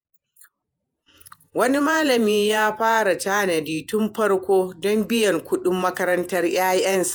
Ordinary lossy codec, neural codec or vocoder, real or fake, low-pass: none; vocoder, 48 kHz, 128 mel bands, Vocos; fake; none